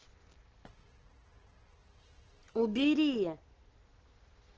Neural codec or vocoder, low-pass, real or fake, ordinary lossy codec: none; 7.2 kHz; real; Opus, 16 kbps